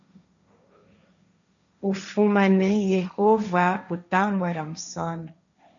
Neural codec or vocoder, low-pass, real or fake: codec, 16 kHz, 1.1 kbps, Voila-Tokenizer; 7.2 kHz; fake